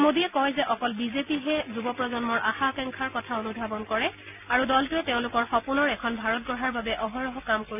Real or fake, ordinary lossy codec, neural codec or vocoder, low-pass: real; none; none; 3.6 kHz